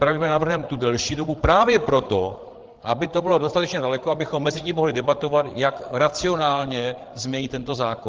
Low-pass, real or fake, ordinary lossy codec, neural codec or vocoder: 7.2 kHz; fake; Opus, 16 kbps; codec, 16 kHz, 8 kbps, FreqCodec, larger model